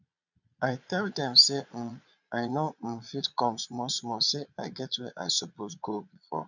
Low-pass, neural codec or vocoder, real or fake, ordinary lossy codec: 7.2 kHz; vocoder, 22.05 kHz, 80 mel bands, Vocos; fake; none